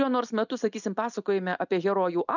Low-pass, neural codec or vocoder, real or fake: 7.2 kHz; none; real